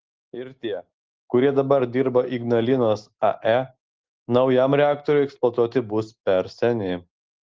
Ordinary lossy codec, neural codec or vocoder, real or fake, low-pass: Opus, 16 kbps; none; real; 7.2 kHz